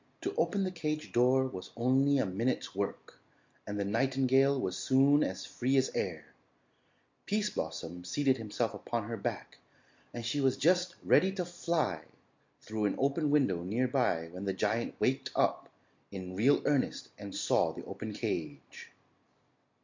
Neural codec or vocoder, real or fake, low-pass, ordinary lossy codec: none; real; 7.2 kHz; MP3, 48 kbps